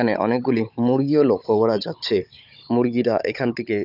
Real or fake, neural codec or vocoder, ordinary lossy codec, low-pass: fake; codec, 16 kHz, 16 kbps, FunCodec, trained on Chinese and English, 50 frames a second; none; 5.4 kHz